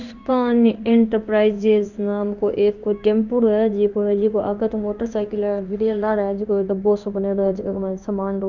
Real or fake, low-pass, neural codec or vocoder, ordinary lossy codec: fake; 7.2 kHz; codec, 16 kHz in and 24 kHz out, 1 kbps, XY-Tokenizer; none